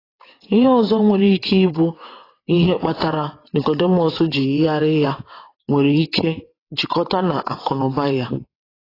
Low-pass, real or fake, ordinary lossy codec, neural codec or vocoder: 5.4 kHz; fake; AAC, 24 kbps; vocoder, 22.05 kHz, 80 mel bands, WaveNeXt